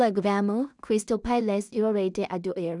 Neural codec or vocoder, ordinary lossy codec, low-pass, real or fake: codec, 16 kHz in and 24 kHz out, 0.4 kbps, LongCat-Audio-Codec, two codebook decoder; none; 10.8 kHz; fake